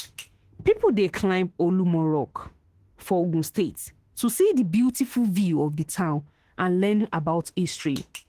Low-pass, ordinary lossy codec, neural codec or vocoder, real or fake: 14.4 kHz; Opus, 16 kbps; autoencoder, 48 kHz, 32 numbers a frame, DAC-VAE, trained on Japanese speech; fake